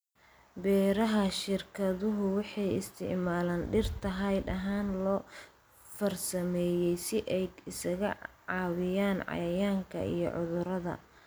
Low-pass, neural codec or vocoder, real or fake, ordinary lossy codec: none; none; real; none